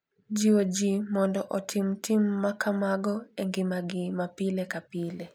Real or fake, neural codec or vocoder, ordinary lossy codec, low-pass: real; none; none; 19.8 kHz